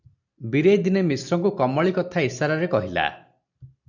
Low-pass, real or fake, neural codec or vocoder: 7.2 kHz; real; none